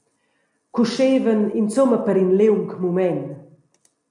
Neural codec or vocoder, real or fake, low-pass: none; real; 10.8 kHz